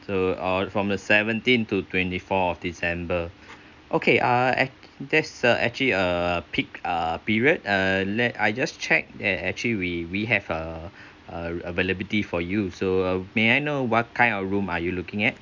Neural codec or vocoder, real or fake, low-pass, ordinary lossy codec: none; real; 7.2 kHz; none